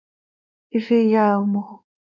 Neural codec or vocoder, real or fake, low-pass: codec, 16 kHz, 2 kbps, X-Codec, WavLM features, trained on Multilingual LibriSpeech; fake; 7.2 kHz